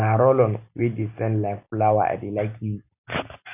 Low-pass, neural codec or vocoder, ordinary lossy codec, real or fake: 3.6 kHz; none; none; real